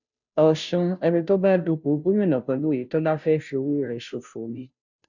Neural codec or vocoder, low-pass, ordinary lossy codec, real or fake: codec, 16 kHz, 0.5 kbps, FunCodec, trained on Chinese and English, 25 frames a second; 7.2 kHz; none; fake